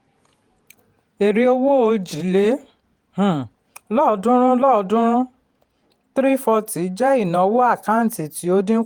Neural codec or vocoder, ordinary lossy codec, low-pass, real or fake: vocoder, 48 kHz, 128 mel bands, Vocos; Opus, 32 kbps; 19.8 kHz; fake